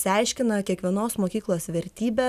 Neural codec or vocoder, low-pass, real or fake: none; 14.4 kHz; real